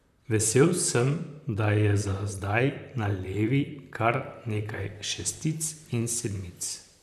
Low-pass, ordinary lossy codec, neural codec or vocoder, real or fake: 14.4 kHz; none; vocoder, 44.1 kHz, 128 mel bands, Pupu-Vocoder; fake